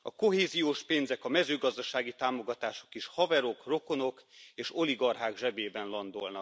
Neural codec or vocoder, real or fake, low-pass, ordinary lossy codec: none; real; none; none